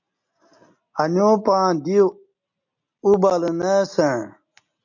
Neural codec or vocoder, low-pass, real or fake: none; 7.2 kHz; real